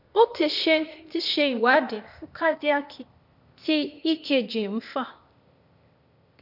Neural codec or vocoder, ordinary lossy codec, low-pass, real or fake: codec, 16 kHz, 0.8 kbps, ZipCodec; none; 5.4 kHz; fake